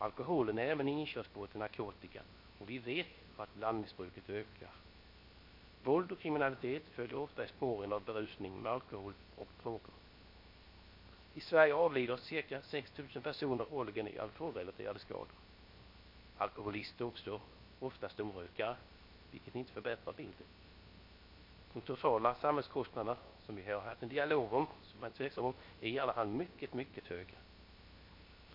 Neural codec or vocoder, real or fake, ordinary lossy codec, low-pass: codec, 16 kHz, 0.7 kbps, FocalCodec; fake; MP3, 32 kbps; 5.4 kHz